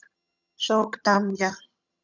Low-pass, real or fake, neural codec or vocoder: 7.2 kHz; fake; vocoder, 22.05 kHz, 80 mel bands, HiFi-GAN